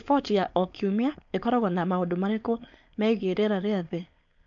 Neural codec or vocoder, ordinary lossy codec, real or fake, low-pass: codec, 16 kHz, 4.8 kbps, FACodec; MP3, 64 kbps; fake; 7.2 kHz